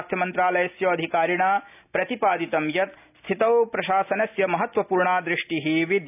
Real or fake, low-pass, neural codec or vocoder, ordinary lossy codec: real; 3.6 kHz; none; AAC, 32 kbps